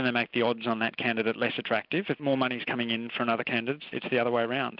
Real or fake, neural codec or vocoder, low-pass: real; none; 5.4 kHz